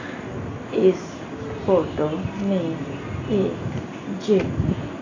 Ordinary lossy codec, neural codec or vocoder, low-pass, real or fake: AAC, 32 kbps; none; 7.2 kHz; real